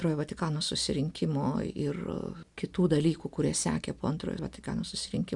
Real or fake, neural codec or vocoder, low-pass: real; none; 10.8 kHz